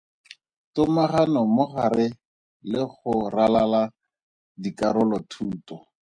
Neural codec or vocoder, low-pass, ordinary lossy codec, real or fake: none; 9.9 kHz; MP3, 48 kbps; real